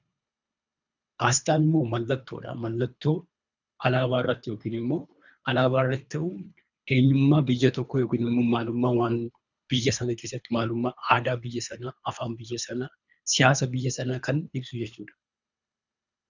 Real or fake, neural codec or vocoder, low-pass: fake; codec, 24 kHz, 3 kbps, HILCodec; 7.2 kHz